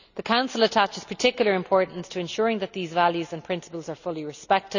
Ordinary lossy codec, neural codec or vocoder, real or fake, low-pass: none; none; real; 7.2 kHz